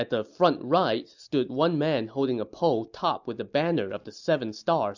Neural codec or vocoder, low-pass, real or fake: none; 7.2 kHz; real